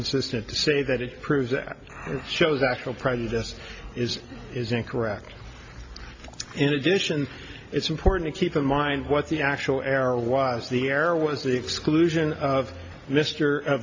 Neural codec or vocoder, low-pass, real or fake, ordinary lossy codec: none; 7.2 kHz; real; AAC, 48 kbps